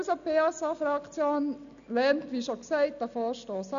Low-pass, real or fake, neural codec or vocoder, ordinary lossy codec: 7.2 kHz; real; none; none